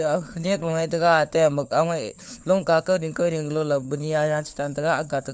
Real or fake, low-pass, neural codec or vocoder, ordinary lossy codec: fake; none; codec, 16 kHz, 4 kbps, FunCodec, trained on LibriTTS, 50 frames a second; none